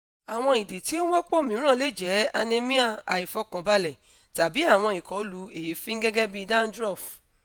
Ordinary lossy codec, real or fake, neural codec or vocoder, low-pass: none; fake; vocoder, 48 kHz, 128 mel bands, Vocos; none